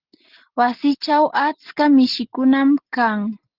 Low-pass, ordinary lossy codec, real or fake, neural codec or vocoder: 5.4 kHz; Opus, 16 kbps; real; none